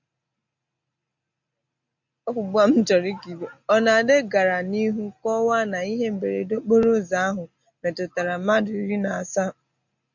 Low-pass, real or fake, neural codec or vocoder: 7.2 kHz; real; none